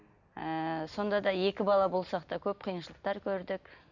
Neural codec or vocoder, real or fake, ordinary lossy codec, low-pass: none; real; none; 7.2 kHz